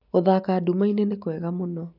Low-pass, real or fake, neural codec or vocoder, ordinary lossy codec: 5.4 kHz; real; none; none